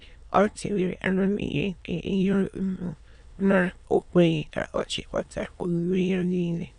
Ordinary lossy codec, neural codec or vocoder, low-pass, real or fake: none; autoencoder, 22.05 kHz, a latent of 192 numbers a frame, VITS, trained on many speakers; 9.9 kHz; fake